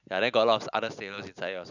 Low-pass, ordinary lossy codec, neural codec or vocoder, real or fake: 7.2 kHz; none; none; real